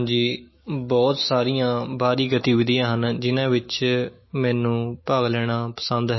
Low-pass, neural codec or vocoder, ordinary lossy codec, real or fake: 7.2 kHz; autoencoder, 48 kHz, 128 numbers a frame, DAC-VAE, trained on Japanese speech; MP3, 24 kbps; fake